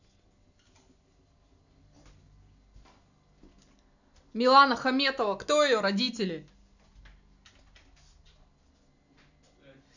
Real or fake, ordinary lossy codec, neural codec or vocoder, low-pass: real; none; none; 7.2 kHz